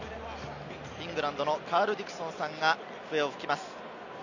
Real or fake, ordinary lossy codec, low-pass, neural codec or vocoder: real; none; 7.2 kHz; none